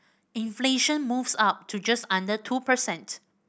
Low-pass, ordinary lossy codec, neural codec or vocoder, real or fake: none; none; none; real